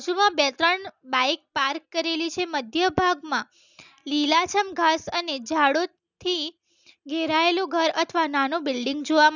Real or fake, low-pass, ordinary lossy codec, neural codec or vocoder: real; 7.2 kHz; none; none